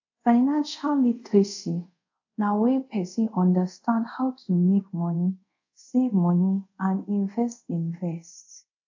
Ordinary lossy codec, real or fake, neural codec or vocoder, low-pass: AAC, 48 kbps; fake; codec, 24 kHz, 0.5 kbps, DualCodec; 7.2 kHz